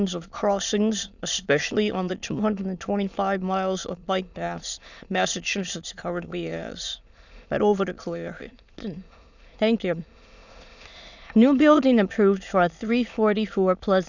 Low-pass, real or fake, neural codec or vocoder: 7.2 kHz; fake; autoencoder, 22.05 kHz, a latent of 192 numbers a frame, VITS, trained on many speakers